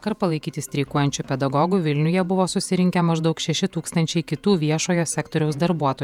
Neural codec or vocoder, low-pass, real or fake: vocoder, 48 kHz, 128 mel bands, Vocos; 19.8 kHz; fake